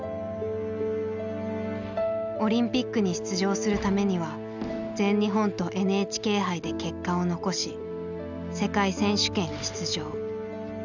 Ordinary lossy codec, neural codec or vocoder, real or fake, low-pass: none; none; real; 7.2 kHz